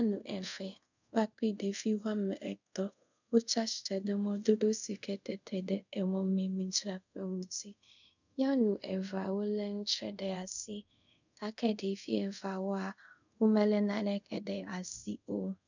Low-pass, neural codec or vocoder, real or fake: 7.2 kHz; codec, 24 kHz, 0.5 kbps, DualCodec; fake